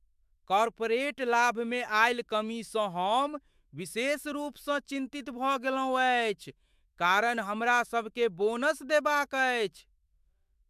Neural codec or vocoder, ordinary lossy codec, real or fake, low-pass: autoencoder, 48 kHz, 128 numbers a frame, DAC-VAE, trained on Japanese speech; MP3, 96 kbps; fake; 14.4 kHz